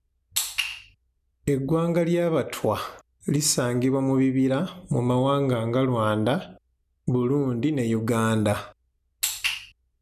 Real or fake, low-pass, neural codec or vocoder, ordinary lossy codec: real; 14.4 kHz; none; none